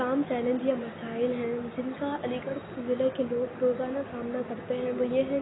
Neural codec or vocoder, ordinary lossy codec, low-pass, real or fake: none; AAC, 16 kbps; 7.2 kHz; real